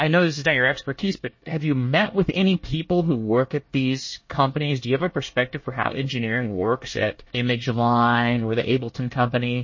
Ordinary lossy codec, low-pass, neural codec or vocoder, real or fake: MP3, 32 kbps; 7.2 kHz; codec, 24 kHz, 1 kbps, SNAC; fake